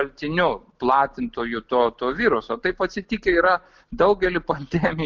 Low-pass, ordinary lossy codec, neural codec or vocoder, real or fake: 7.2 kHz; Opus, 24 kbps; none; real